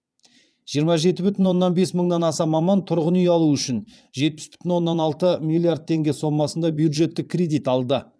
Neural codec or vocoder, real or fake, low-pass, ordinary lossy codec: vocoder, 22.05 kHz, 80 mel bands, Vocos; fake; none; none